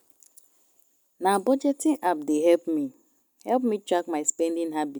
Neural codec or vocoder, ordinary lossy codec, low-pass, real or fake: none; none; none; real